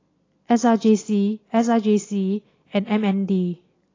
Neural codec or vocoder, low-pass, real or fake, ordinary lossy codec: none; 7.2 kHz; real; AAC, 32 kbps